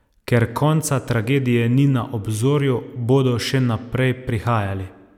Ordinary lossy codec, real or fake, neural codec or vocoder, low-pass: none; real; none; 19.8 kHz